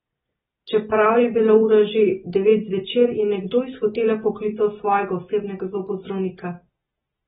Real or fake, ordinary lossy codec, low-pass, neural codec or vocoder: real; AAC, 16 kbps; 19.8 kHz; none